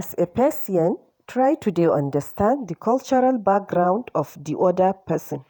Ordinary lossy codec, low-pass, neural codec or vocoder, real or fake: none; none; vocoder, 48 kHz, 128 mel bands, Vocos; fake